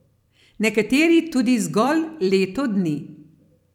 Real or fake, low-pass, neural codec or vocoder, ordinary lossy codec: fake; 19.8 kHz; vocoder, 44.1 kHz, 128 mel bands every 256 samples, BigVGAN v2; none